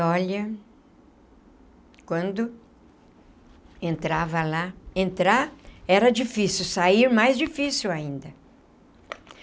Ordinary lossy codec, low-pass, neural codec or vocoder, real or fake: none; none; none; real